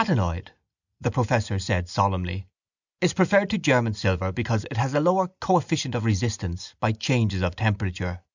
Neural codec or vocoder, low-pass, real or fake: none; 7.2 kHz; real